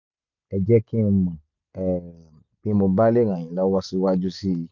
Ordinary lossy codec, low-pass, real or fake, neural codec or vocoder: none; 7.2 kHz; real; none